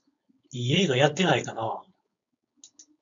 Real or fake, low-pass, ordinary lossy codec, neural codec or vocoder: fake; 7.2 kHz; AAC, 64 kbps; codec, 16 kHz, 4.8 kbps, FACodec